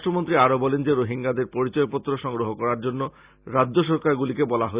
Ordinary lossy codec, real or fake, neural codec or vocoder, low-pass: Opus, 64 kbps; real; none; 3.6 kHz